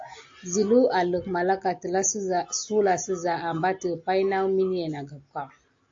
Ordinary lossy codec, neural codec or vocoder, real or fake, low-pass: AAC, 48 kbps; none; real; 7.2 kHz